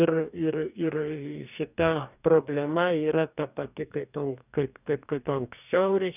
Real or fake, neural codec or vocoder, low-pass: fake; codec, 44.1 kHz, 2.6 kbps, DAC; 3.6 kHz